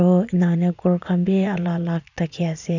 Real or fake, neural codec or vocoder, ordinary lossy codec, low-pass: fake; vocoder, 44.1 kHz, 80 mel bands, Vocos; none; 7.2 kHz